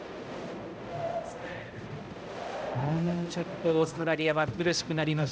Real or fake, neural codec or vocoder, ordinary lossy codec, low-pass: fake; codec, 16 kHz, 0.5 kbps, X-Codec, HuBERT features, trained on balanced general audio; none; none